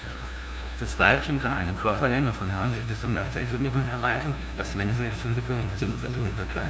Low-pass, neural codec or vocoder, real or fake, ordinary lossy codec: none; codec, 16 kHz, 0.5 kbps, FunCodec, trained on LibriTTS, 25 frames a second; fake; none